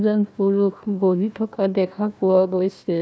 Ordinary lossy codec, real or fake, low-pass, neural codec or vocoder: none; fake; none; codec, 16 kHz, 1 kbps, FunCodec, trained on Chinese and English, 50 frames a second